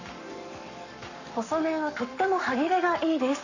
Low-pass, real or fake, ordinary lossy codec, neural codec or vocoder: 7.2 kHz; fake; none; codec, 44.1 kHz, 7.8 kbps, Pupu-Codec